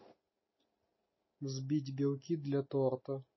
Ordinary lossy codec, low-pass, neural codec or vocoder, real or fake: MP3, 24 kbps; 7.2 kHz; none; real